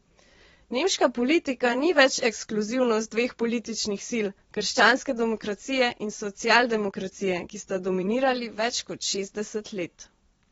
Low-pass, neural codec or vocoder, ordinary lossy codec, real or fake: 9.9 kHz; vocoder, 22.05 kHz, 80 mel bands, Vocos; AAC, 24 kbps; fake